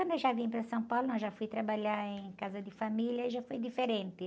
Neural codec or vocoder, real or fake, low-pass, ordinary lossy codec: none; real; none; none